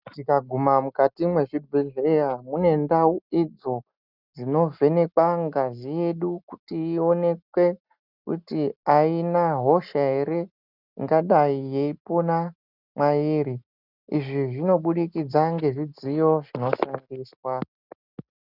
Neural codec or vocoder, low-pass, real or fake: none; 5.4 kHz; real